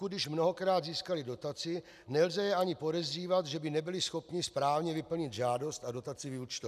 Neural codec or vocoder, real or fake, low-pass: none; real; 14.4 kHz